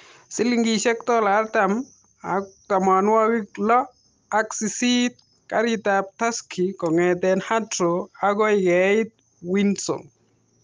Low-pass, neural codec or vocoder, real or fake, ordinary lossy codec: 7.2 kHz; none; real; Opus, 32 kbps